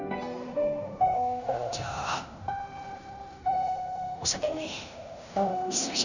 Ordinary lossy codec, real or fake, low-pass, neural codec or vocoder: none; fake; 7.2 kHz; codec, 16 kHz in and 24 kHz out, 0.9 kbps, LongCat-Audio-Codec, fine tuned four codebook decoder